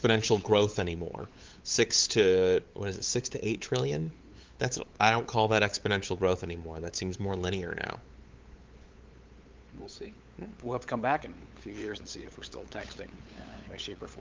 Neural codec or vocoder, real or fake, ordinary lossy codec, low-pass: codec, 16 kHz, 8 kbps, FunCodec, trained on LibriTTS, 25 frames a second; fake; Opus, 32 kbps; 7.2 kHz